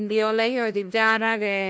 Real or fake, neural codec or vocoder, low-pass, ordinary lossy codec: fake; codec, 16 kHz, 1 kbps, FunCodec, trained on LibriTTS, 50 frames a second; none; none